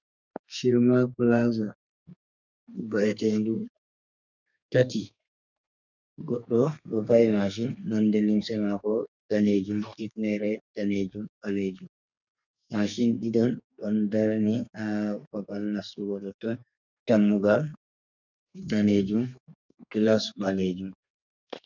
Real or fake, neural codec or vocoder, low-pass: fake; codec, 32 kHz, 1.9 kbps, SNAC; 7.2 kHz